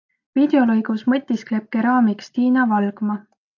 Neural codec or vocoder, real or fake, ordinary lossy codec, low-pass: none; real; AAC, 48 kbps; 7.2 kHz